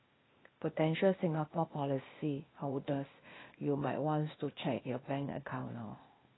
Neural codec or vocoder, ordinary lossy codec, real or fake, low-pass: codec, 16 kHz, 0.8 kbps, ZipCodec; AAC, 16 kbps; fake; 7.2 kHz